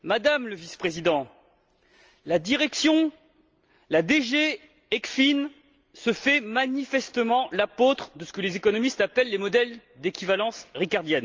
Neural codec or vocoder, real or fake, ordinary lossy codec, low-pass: none; real; Opus, 24 kbps; 7.2 kHz